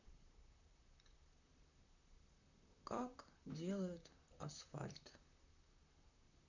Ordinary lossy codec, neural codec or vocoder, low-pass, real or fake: none; vocoder, 44.1 kHz, 128 mel bands, Pupu-Vocoder; 7.2 kHz; fake